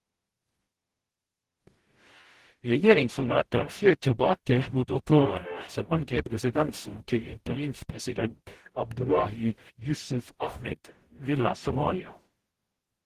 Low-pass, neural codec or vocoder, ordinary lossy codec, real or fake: 14.4 kHz; codec, 44.1 kHz, 0.9 kbps, DAC; Opus, 16 kbps; fake